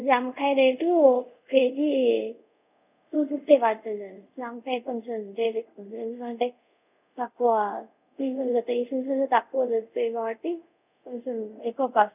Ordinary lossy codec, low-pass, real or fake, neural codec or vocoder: none; 3.6 kHz; fake; codec, 24 kHz, 0.5 kbps, DualCodec